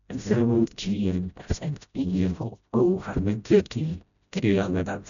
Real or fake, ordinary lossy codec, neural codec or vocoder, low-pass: fake; none; codec, 16 kHz, 0.5 kbps, FreqCodec, smaller model; 7.2 kHz